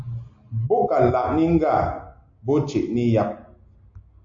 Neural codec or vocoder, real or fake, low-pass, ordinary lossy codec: none; real; 7.2 kHz; AAC, 64 kbps